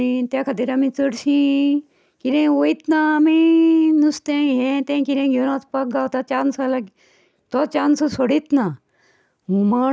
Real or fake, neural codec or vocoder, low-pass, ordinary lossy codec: real; none; none; none